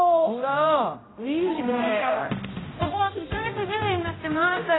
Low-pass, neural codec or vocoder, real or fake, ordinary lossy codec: 7.2 kHz; codec, 16 kHz, 0.5 kbps, X-Codec, HuBERT features, trained on general audio; fake; AAC, 16 kbps